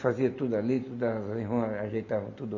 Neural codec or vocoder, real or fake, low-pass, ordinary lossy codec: none; real; 7.2 kHz; MP3, 48 kbps